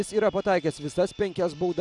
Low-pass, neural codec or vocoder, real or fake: 10.8 kHz; none; real